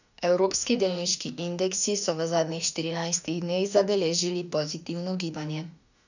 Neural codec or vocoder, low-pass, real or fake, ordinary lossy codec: autoencoder, 48 kHz, 32 numbers a frame, DAC-VAE, trained on Japanese speech; 7.2 kHz; fake; none